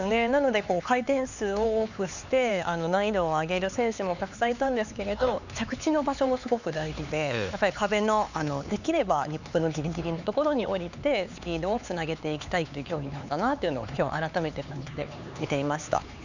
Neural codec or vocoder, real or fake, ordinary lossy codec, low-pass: codec, 16 kHz, 4 kbps, X-Codec, HuBERT features, trained on LibriSpeech; fake; none; 7.2 kHz